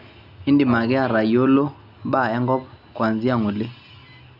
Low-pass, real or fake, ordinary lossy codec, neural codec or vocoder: 5.4 kHz; real; none; none